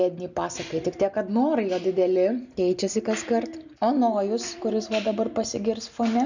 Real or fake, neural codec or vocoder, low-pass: real; none; 7.2 kHz